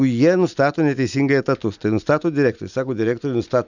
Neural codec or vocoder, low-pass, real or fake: none; 7.2 kHz; real